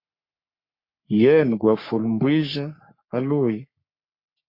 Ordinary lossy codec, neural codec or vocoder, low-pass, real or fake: MP3, 32 kbps; codec, 44.1 kHz, 3.4 kbps, Pupu-Codec; 5.4 kHz; fake